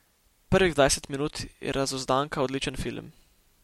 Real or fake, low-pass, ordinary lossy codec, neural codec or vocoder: real; 19.8 kHz; MP3, 64 kbps; none